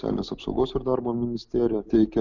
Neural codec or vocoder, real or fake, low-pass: none; real; 7.2 kHz